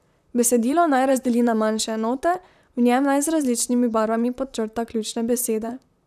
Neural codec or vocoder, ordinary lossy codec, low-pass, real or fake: vocoder, 44.1 kHz, 128 mel bands, Pupu-Vocoder; none; 14.4 kHz; fake